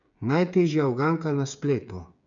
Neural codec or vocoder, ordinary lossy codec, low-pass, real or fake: codec, 16 kHz, 8 kbps, FreqCodec, smaller model; none; 7.2 kHz; fake